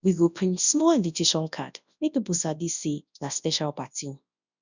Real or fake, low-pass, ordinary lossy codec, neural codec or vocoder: fake; 7.2 kHz; none; codec, 24 kHz, 0.9 kbps, WavTokenizer, large speech release